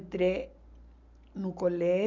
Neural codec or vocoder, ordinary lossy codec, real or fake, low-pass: none; none; real; 7.2 kHz